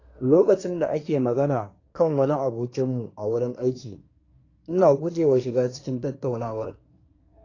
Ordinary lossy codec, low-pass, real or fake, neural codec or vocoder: AAC, 32 kbps; 7.2 kHz; fake; codec, 24 kHz, 1 kbps, SNAC